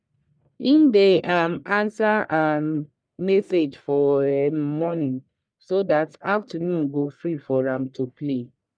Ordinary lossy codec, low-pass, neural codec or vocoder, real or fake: none; 9.9 kHz; codec, 44.1 kHz, 1.7 kbps, Pupu-Codec; fake